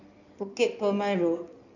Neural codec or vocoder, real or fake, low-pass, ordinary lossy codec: codec, 16 kHz in and 24 kHz out, 2.2 kbps, FireRedTTS-2 codec; fake; 7.2 kHz; none